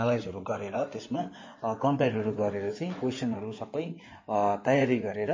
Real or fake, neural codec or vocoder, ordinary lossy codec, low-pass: fake; codec, 16 kHz in and 24 kHz out, 2.2 kbps, FireRedTTS-2 codec; MP3, 32 kbps; 7.2 kHz